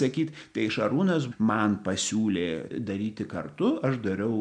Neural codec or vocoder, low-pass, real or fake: none; 9.9 kHz; real